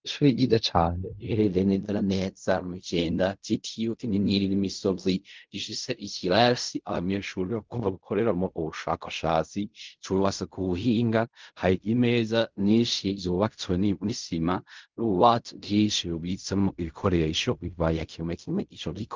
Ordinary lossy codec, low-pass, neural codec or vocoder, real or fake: Opus, 24 kbps; 7.2 kHz; codec, 16 kHz in and 24 kHz out, 0.4 kbps, LongCat-Audio-Codec, fine tuned four codebook decoder; fake